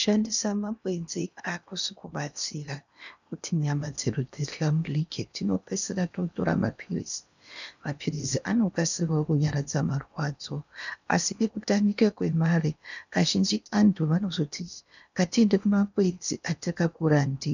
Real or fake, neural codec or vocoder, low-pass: fake; codec, 16 kHz in and 24 kHz out, 0.8 kbps, FocalCodec, streaming, 65536 codes; 7.2 kHz